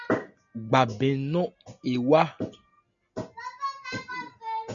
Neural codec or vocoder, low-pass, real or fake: none; 7.2 kHz; real